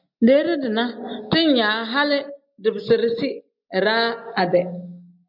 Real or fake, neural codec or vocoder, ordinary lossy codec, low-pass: real; none; MP3, 48 kbps; 5.4 kHz